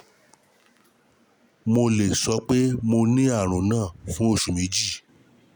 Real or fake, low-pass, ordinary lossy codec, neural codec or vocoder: real; none; none; none